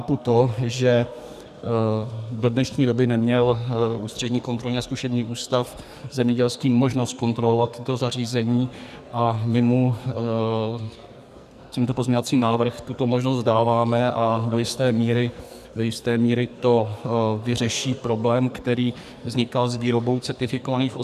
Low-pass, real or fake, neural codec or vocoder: 14.4 kHz; fake; codec, 44.1 kHz, 2.6 kbps, SNAC